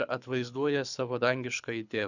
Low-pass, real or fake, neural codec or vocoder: 7.2 kHz; fake; codec, 24 kHz, 6 kbps, HILCodec